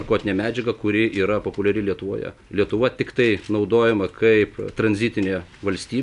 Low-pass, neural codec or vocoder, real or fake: 10.8 kHz; none; real